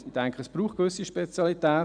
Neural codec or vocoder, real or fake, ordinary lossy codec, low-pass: none; real; none; 9.9 kHz